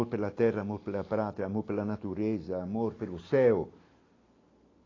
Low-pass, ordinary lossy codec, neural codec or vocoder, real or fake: 7.2 kHz; AAC, 32 kbps; none; real